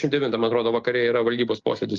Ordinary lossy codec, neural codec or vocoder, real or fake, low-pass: Opus, 24 kbps; none; real; 7.2 kHz